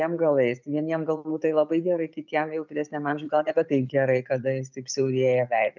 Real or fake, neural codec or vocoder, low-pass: real; none; 7.2 kHz